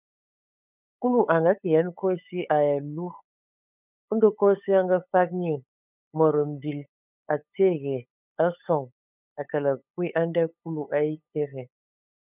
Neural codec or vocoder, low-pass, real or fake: codec, 16 kHz, 8 kbps, FunCodec, trained on LibriTTS, 25 frames a second; 3.6 kHz; fake